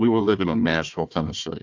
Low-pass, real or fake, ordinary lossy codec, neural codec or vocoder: 7.2 kHz; fake; AAC, 48 kbps; codec, 16 kHz, 1 kbps, FunCodec, trained on Chinese and English, 50 frames a second